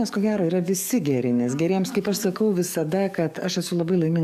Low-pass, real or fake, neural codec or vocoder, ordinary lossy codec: 14.4 kHz; fake; codec, 44.1 kHz, 7.8 kbps, DAC; AAC, 96 kbps